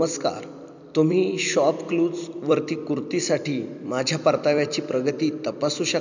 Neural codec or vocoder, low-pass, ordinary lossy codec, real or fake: vocoder, 44.1 kHz, 128 mel bands every 256 samples, BigVGAN v2; 7.2 kHz; none; fake